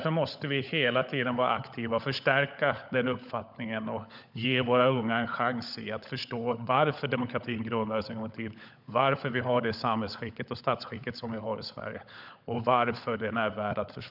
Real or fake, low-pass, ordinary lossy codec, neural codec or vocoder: fake; 5.4 kHz; none; codec, 16 kHz, 16 kbps, FunCodec, trained on LibriTTS, 50 frames a second